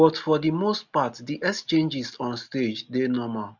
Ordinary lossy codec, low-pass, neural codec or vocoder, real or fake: none; 7.2 kHz; none; real